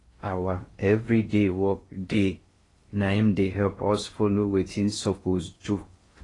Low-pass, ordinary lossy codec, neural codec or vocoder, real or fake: 10.8 kHz; AAC, 32 kbps; codec, 16 kHz in and 24 kHz out, 0.6 kbps, FocalCodec, streaming, 4096 codes; fake